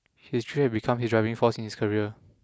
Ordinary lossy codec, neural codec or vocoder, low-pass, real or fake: none; none; none; real